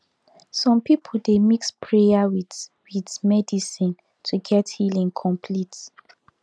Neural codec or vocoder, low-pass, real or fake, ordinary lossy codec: none; none; real; none